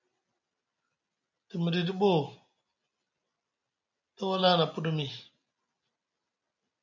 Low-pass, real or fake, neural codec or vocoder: 7.2 kHz; real; none